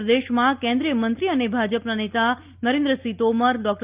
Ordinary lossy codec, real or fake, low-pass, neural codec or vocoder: Opus, 24 kbps; real; 3.6 kHz; none